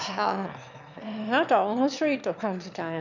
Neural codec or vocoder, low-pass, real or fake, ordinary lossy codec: autoencoder, 22.05 kHz, a latent of 192 numbers a frame, VITS, trained on one speaker; 7.2 kHz; fake; none